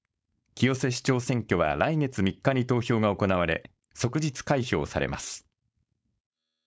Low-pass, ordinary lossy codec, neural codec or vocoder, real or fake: none; none; codec, 16 kHz, 4.8 kbps, FACodec; fake